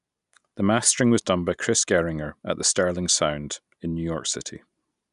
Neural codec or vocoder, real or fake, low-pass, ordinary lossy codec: none; real; 10.8 kHz; none